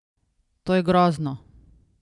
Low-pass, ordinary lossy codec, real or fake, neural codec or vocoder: 10.8 kHz; none; real; none